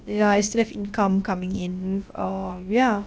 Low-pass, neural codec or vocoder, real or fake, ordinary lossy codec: none; codec, 16 kHz, about 1 kbps, DyCAST, with the encoder's durations; fake; none